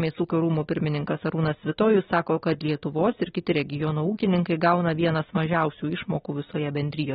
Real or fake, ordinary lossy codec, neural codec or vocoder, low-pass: fake; AAC, 16 kbps; vocoder, 44.1 kHz, 128 mel bands every 256 samples, BigVGAN v2; 19.8 kHz